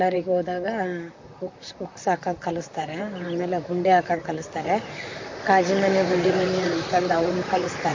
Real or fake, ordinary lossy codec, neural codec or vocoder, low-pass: fake; MP3, 48 kbps; vocoder, 44.1 kHz, 128 mel bands, Pupu-Vocoder; 7.2 kHz